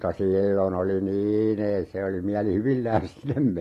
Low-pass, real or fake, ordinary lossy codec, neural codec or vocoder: 14.4 kHz; real; AAC, 48 kbps; none